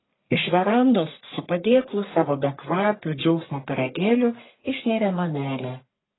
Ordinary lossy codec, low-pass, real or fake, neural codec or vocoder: AAC, 16 kbps; 7.2 kHz; fake; codec, 44.1 kHz, 1.7 kbps, Pupu-Codec